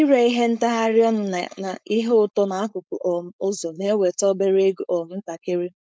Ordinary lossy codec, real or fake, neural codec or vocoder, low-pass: none; fake; codec, 16 kHz, 4.8 kbps, FACodec; none